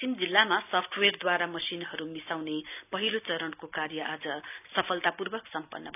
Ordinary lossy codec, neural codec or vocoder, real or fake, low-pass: none; none; real; 3.6 kHz